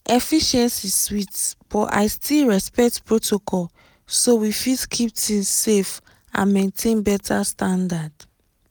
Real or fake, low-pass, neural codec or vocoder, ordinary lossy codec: real; none; none; none